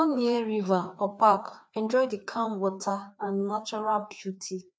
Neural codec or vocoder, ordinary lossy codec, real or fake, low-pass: codec, 16 kHz, 2 kbps, FreqCodec, larger model; none; fake; none